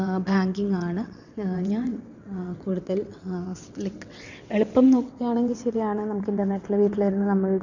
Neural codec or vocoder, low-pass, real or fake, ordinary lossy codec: vocoder, 22.05 kHz, 80 mel bands, WaveNeXt; 7.2 kHz; fake; none